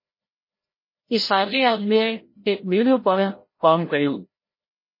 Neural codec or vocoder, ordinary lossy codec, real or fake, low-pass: codec, 16 kHz, 0.5 kbps, FreqCodec, larger model; MP3, 24 kbps; fake; 5.4 kHz